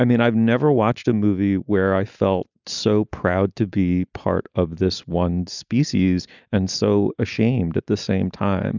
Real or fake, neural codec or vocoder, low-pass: real; none; 7.2 kHz